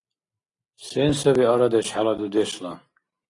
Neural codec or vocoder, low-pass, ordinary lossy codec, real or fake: none; 10.8 kHz; AAC, 32 kbps; real